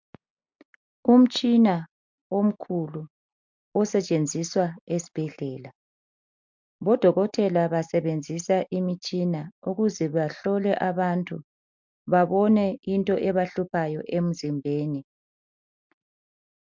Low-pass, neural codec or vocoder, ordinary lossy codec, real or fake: 7.2 kHz; none; MP3, 64 kbps; real